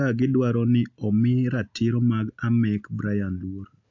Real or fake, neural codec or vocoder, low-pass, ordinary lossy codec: real; none; 7.2 kHz; AAC, 48 kbps